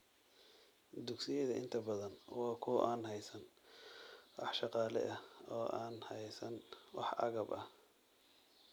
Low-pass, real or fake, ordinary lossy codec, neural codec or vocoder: none; real; none; none